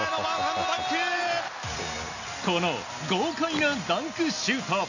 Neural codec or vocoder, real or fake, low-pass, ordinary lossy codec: none; real; 7.2 kHz; none